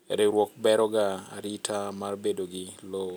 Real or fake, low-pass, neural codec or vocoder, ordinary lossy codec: real; none; none; none